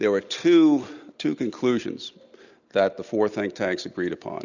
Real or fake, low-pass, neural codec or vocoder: real; 7.2 kHz; none